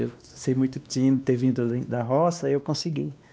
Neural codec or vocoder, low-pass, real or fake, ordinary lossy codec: codec, 16 kHz, 2 kbps, X-Codec, WavLM features, trained on Multilingual LibriSpeech; none; fake; none